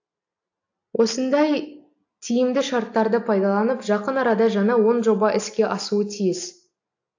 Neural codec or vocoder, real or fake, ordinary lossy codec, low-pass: none; real; AAC, 48 kbps; 7.2 kHz